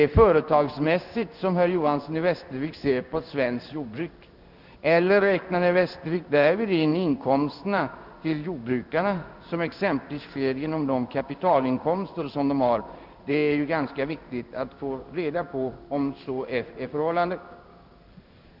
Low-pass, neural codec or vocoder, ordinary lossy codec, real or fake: 5.4 kHz; codec, 16 kHz in and 24 kHz out, 1 kbps, XY-Tokenizer; none; fake